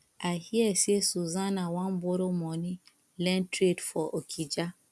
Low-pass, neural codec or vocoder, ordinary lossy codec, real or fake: none; none; none; real